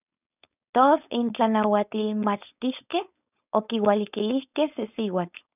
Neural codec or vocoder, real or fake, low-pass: codec, 16 kHz, 4.8 kbps, FACodec; fake; 3.6 kHz